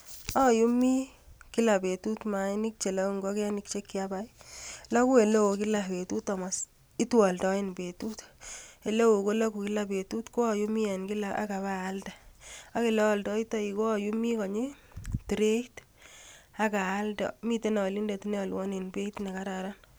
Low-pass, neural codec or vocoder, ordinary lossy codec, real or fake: none; none; none; real